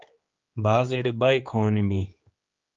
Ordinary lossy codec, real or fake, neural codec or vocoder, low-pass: Opus, 32 kbps; fake; codec, 16 kHz, 2 kbps, X-Codec, HuBERT features, trained on general audio; 7.2 kHz